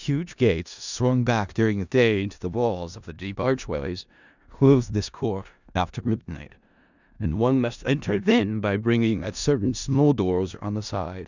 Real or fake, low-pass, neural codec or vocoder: fake; 7.2 kHz; codec, 16 kHz in and 24 kHz out, 0.4 kbps, LongCat-Audio-Codec, four codebook decoder